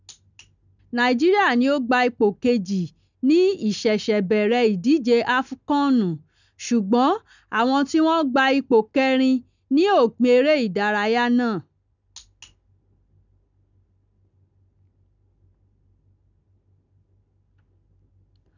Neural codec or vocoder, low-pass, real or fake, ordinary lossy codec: none; 7.2 kHz; real; none